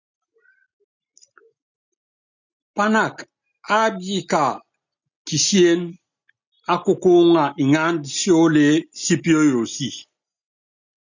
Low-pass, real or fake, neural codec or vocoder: 7.2 kHz; real; none